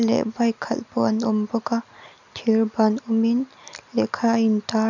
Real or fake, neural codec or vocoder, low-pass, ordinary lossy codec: real; none; 7.2 kHz; none